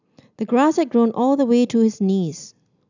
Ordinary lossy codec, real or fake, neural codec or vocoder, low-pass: none; real; none; 7.2 kHz